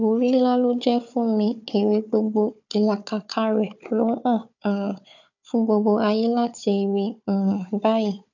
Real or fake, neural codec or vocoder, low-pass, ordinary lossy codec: fake; codec, 16 kHz, 4 kbps, FunCodec, trained on Chinese and English, 50 frames a second; 7.2 kHz; none